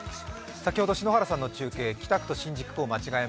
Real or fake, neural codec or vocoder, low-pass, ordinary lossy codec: real; none; none; none